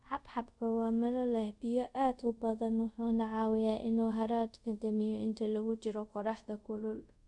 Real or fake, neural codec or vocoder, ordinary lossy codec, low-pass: fake; codec, 24 kHz, 0.5 kbps, DualCodec; none; 10.8 kHz